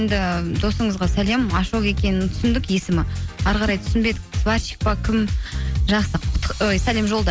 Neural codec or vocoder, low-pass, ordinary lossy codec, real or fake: none; none; none; real